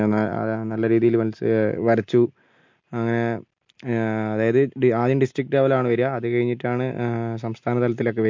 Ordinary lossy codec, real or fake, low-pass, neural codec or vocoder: MP3, 48 kbps; real; 7.2 kHz; none